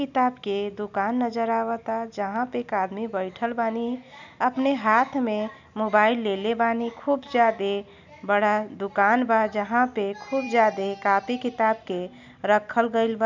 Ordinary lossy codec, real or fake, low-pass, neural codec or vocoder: none; real; 7.2 kHz; none